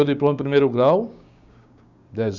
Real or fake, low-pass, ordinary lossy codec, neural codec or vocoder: fake; 7.2 kHz; Opus, 64 kbps; codec, 16 kHz, 6 kbps, DAC